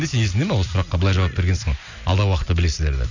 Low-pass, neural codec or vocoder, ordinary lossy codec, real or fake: 7.2 kHz; none; none; real